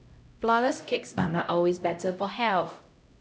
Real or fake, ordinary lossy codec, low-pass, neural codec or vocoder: fake; none; none; codec, 16 kHz, 0.5 kbps, X-Codec, HuBERT features, trained on LibriSpeech